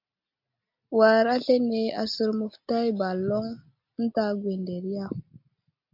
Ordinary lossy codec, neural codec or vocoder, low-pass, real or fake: MP3, 48 kbps; none; 5.4 kHz; real